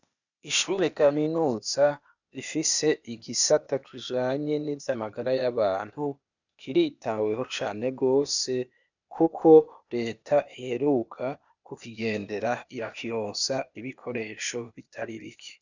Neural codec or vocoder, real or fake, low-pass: codec, 16 kHz, 0.8 kbps, ZipCodec; fake; 7.2 kHz